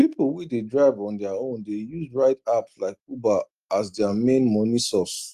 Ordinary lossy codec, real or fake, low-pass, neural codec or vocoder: Opus, 32 kbps; real; 14.4 kHz; none